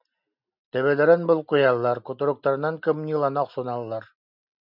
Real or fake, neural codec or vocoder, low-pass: real; none; 5.4 kHz